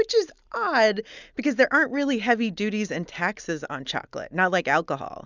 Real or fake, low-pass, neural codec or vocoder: real; 7.2 kHz; none